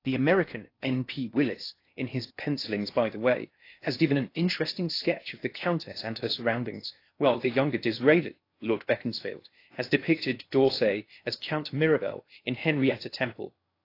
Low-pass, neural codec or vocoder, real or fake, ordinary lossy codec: 5.4 kHz; codec, 16 kHz in and 24 kHz out, 0.6 kbps, FocalCodec, streaming, 4096 codes; fake; AAC, 32 kbps